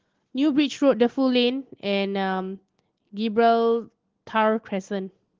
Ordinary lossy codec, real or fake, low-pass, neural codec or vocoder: Opus, 16 kbps; real; 7.2 kHz; none